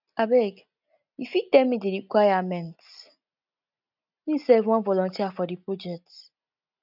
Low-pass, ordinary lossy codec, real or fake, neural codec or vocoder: 5.4 kHz; none; real; none